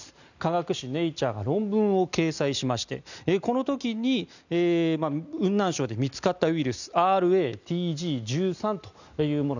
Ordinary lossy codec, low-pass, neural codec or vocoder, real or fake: none; 7.2 kHz; none; real